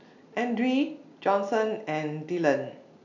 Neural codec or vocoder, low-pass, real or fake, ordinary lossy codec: none; 7.2 kHz; real; none